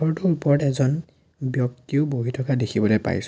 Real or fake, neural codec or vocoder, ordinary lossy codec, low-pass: real; none; none; none